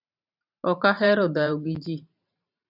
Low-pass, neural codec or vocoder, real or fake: 5.4 kHz; vocoder, 44.1 kHz, 128 mel bands every 512 samples, BigVGAN v2; fake